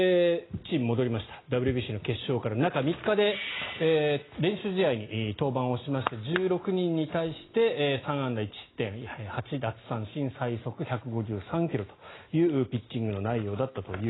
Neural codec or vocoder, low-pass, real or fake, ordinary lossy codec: none; 7.2 kHz; real; AAC, 16 kbps